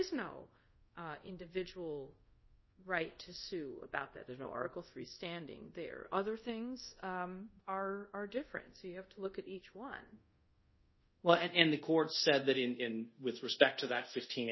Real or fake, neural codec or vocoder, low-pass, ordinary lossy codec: fake; codec, 24 kHz, 0.5 kbps, DualCodec; 7.2 kHz; MP3, 24 kbps